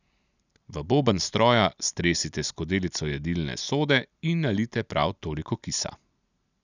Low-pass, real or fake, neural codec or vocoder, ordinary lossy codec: 7.2 kHz; real; none; none